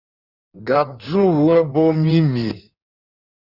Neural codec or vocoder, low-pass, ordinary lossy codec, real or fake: codec, 16 kHz in and 24 kHz out, 1.1 kbps, FireRedTTS-2 codec; 5.4 kHz; Opus, 64 kbps; fake